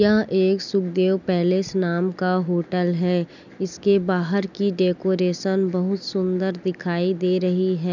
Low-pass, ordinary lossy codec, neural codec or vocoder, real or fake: 7.2 kHz; none; none; real